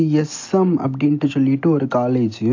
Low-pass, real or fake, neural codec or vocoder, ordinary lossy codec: 7.2 kHz; real; none; AAC, 48 kbps